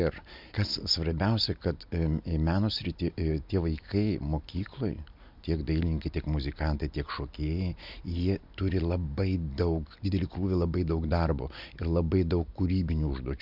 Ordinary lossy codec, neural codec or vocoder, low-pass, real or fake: MP3, 48 kbps; none; 5.4 kHz; real